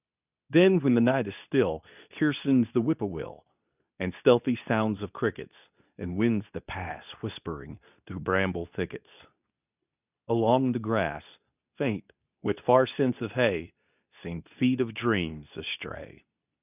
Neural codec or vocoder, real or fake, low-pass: codec, 24 kHz, 0.9 kbps, WavTokenizer, medium speech release version 2; fake; 3.6 kHz